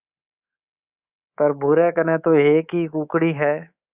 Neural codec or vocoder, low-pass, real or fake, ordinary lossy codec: codec, 24 kHz, 3.1 kbps, DualCodec; 3.6 kHz; fake; Opus, 64 kbps